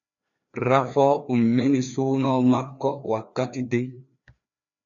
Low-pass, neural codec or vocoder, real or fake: 7.2 kHz; codec, 16 kHz, 2 kbps, FreqCodec, larger model; fake